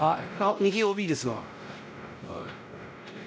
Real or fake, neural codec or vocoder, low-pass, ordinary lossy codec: fake; codec, 16 kHz, 0.5 kbps, X-Codec, WavLM features, trained on Multilingual LibriSpeech; none; none